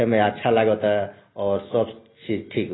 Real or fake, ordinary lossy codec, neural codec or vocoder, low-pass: real; AAC, 16 kbps; none; 7.2 kHz